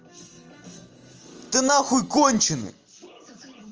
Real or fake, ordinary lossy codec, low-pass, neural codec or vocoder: real; Opus, 24 kbps; 7.2 kHz; none